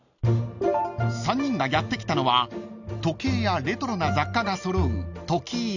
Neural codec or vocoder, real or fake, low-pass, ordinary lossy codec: none; real; 7.2 kHz; none